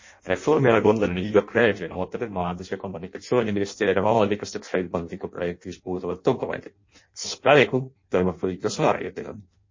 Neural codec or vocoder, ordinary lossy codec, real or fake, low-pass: codec, 16 kHz in and 24 kHz out, 0.6 kbps, FireRedTTS-2 codec; MP3, 32 kbps; fake; 7.2 kHz